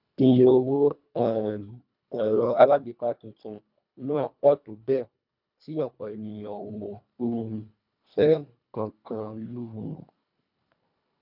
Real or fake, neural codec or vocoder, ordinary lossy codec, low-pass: fake; codec, 24 kHz, 1.5 kbps, HILCodec; none; 5.4 kHz